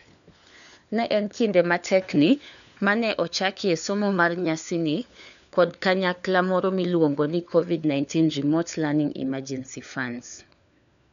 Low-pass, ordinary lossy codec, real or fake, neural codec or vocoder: 7.2 kHz; none; fake; codec, 16 kHz, 4 kbps, FunCodec, trained on LibriTTS, 50 frames a second